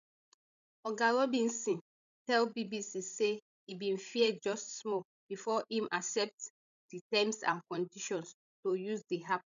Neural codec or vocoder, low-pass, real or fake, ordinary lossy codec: codec, 16 kHz, 16 kbps, FreqCodec, larger model; 7.2 kHz; fake; none